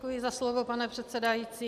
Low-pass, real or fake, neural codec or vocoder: 14.4 kHz; real; none